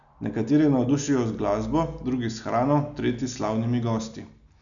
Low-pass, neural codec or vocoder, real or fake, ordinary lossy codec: 7.2 kHz; none; real; MP3, 96 kbps